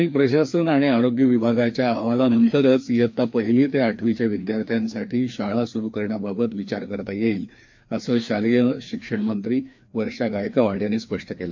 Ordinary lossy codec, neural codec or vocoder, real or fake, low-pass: MP3, 48 kbps; codec, 16 kHz, 2 kbps, FreqCodec, larger model; fake; 7.2 kHz